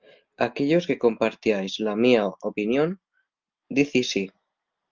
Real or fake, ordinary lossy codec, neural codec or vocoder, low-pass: real; Opus, 32 kbps; none; 7.2 kHz